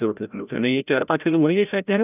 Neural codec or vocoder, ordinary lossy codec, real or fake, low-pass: codec, 16 kHz, 0.5 kbps, FreqCodec, larger model; none; fake; 3.6 kHz